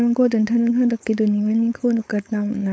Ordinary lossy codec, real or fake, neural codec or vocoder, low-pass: none; fake; codec, 16 kHz, 4.8 kbps, FACodec; none